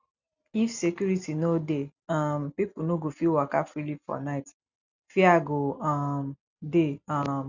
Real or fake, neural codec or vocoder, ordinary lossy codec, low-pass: real; none; none; 7.2 kHz